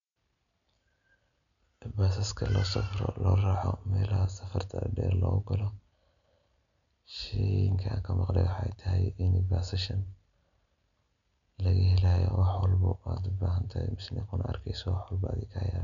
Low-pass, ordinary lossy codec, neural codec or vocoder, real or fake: 7.2 kHz; none; none; real